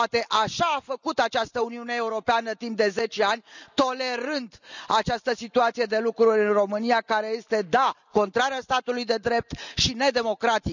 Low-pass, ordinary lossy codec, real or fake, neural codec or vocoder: 7.2 kHz; none; real; none